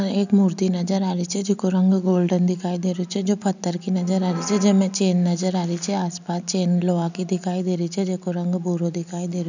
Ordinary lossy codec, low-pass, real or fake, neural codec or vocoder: none; 7.2 kHz; real; none